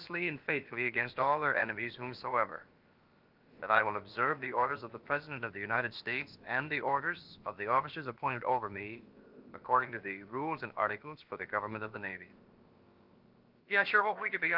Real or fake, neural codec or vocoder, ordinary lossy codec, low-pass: fake; codec, 16 kHz, about 1 kbps, DyCAST, with the encoder's durations; Opus, 24 kbps; 5.4 kHz